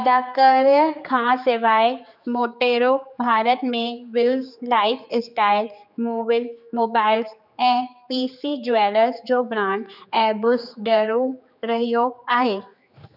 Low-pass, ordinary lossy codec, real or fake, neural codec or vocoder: 5.4 kHz; none; fake; codec, 16 kHz, 4 kbps, X-Codec, HuBERT features, trained on general audio